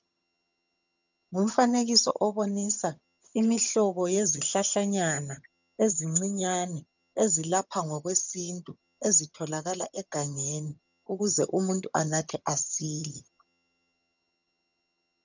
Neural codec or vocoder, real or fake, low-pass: vocoder, 22.05 kHz, 80 mel bands, HiFi-GAN; fake; 7.2 kHz